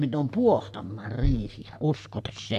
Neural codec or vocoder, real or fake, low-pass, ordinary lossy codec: codec, 44.1 kHz, 3.4 kbps, Pupu-Codec; fake; 14.4 kHz; none